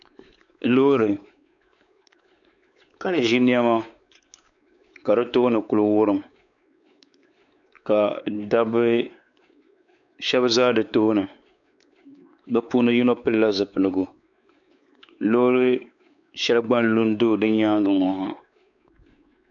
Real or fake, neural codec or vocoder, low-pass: fake; codec, 16 kHz, 4 kbps, X-Codec, WavLM features, trained on Multilingual LibriSpeech; 7.2 kHz